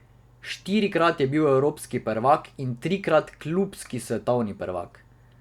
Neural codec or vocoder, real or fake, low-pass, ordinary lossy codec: none; real; 19.8 kHz; Opus, 64 kbps